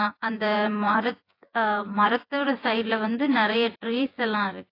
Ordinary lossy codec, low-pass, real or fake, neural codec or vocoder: AAC, 32 kbps; 5.4 kHz; fake; vocoder, 24 kHz, 100 mel bands, Vocos